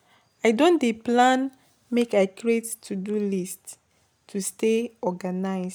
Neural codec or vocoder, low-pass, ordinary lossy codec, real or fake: none; none; none; real